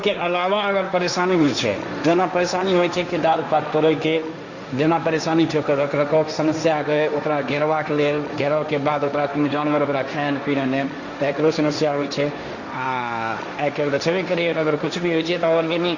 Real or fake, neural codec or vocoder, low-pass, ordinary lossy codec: fake; codec, 16 kHz, 1.1 kbps, Voila-Tokenizer; 7.2 kHz; Opus, 64 kbps